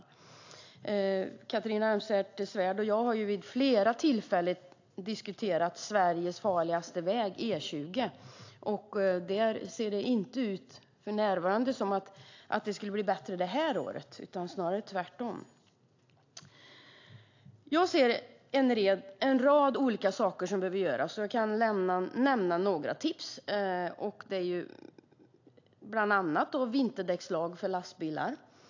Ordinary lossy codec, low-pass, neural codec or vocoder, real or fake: AAC, 48 kbps; 7.2 kHz; none; real